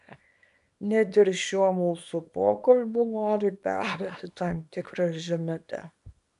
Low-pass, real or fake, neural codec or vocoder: 10.8 kHz; fake; codec, 24 kHz, 0.9 kbps, WavTokenizer, small release